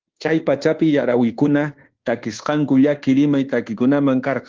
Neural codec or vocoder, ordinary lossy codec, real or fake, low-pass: codec, 24 kHz, 1.2 kbps, DualCodec; Opus, 16 kbps; fake; 7.2 kHz